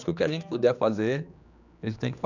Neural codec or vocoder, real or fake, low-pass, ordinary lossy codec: codec, 16 kHz, 2 kbps, X-Codec, HuBERT features, trained on general audio; fake; 7.2 kHz; none